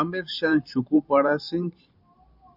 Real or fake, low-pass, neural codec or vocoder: fake; 5.4 kHz; vocoder, 44.1 kHz, 128 mel bands every 512 samples, BigVGAN v2